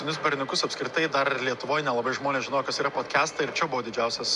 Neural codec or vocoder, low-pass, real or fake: none; 10.8 kHz; real